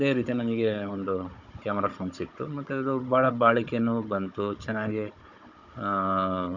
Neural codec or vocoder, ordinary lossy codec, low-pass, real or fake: codec, 16 kHz, 16 kbps, FunCodec, trained on Chinese and English, 50 frames a second; none; 7.2 kHz; fake